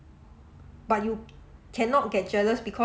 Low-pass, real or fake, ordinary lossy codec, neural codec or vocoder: none; real; none; none